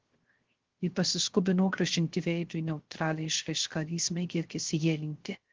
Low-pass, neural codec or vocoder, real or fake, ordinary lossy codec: 7.2 kHz; codec, 16 kHz, 0.3 kbps, FocalCodec; fake; Opus, 16 kbps